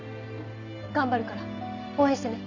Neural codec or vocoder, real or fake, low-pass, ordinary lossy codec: none; real; 7.2 kHz; none